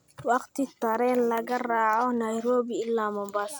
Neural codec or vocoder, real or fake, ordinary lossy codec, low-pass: none; real; none; none